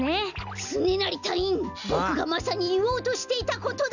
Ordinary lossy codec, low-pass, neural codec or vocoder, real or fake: none; 7.2 kHz; none; real